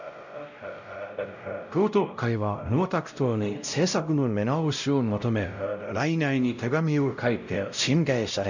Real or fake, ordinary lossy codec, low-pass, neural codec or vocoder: fake; none; 7.2 kHz; codec, 16 kHz, 0.5 kbps, X-Codec, WavLM features, trained on Multilingual LibriSpeech